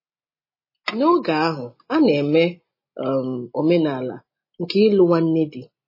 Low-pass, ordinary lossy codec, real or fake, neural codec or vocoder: 5.4 kHz; MP3, 24 kbps; real; none